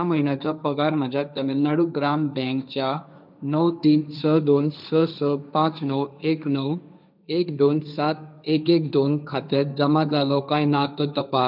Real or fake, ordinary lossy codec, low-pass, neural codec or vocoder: fake; none; 5.4 kHz; codec, 16 kHz, 1.1 kbps, Voila-Tokenizer